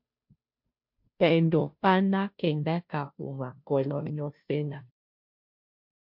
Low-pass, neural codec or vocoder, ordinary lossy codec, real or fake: 5.4 kHz; codec, 16 kHz, 0.5 kbps, FunCodec, trained on Chinese and English, 25 frames a second; MP3, 48 kbps; fake